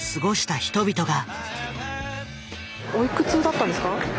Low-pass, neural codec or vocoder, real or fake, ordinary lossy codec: none; none; real; none